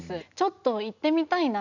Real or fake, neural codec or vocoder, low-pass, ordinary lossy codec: real; none; 7.2 kHz; none